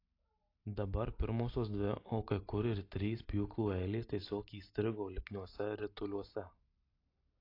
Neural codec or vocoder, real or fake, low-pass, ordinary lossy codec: none; real; 5.4 kHz; AAC, 32 kbps